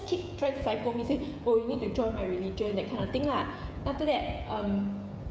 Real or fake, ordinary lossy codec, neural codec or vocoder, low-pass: fake; none; codec, 16 kHz, 16 kbps, FreqCodec, smaller model; none